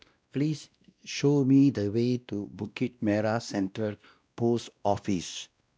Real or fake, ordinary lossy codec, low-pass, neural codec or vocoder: fake; none; none; codec, 16 kHz, 1 kbps, X-Codec, WavLM features, trained on Multilingual LibriSpeech